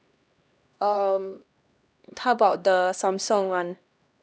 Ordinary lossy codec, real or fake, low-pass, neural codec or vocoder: none; fake; none; codec, 16 kHz, 1 kbps, X-Codec, HuBERT features, trained on LibriSpeech